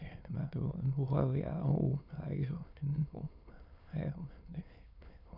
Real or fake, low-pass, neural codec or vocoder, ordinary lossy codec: fake; 5.4 kHz; autoencoder, 22.05 kHz, a latent of 192 numbers a frame, VITS, trained on many speakers; none